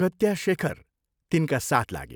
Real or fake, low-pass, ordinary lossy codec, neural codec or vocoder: real; none; none; none